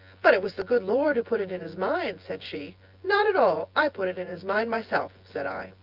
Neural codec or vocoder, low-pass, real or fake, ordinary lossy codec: vocoder, 24 kHz, 100 mel bands, Vocos; 5.4 kHz; fake; Opus, 24 kbps